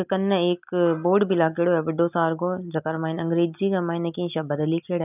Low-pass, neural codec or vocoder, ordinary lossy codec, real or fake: 3.6 kHz; none; none; real